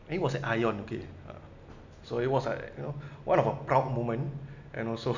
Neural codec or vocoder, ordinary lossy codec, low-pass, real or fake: none; none; 7.2 kHz; real